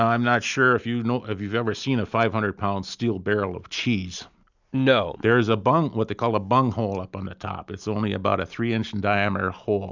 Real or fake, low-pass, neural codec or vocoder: real; 7.2 kHz; none